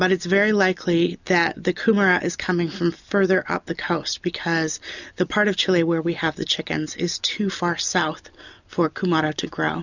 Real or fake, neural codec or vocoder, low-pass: real; none; 7.2 kHz